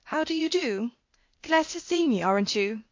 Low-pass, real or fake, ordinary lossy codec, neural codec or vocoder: 7.2 kHz; fake; MP3, 48 kbps; codec, 16 kHz, 0.8 kbps, ZipCodec